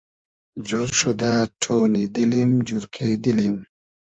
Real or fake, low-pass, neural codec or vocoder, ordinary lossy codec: fake; 9.9 kHz; codec, 16 kHz in and 24 kHz out, 1.1 kbps, FireRedTTS-2 codec; MP3, 64 kbps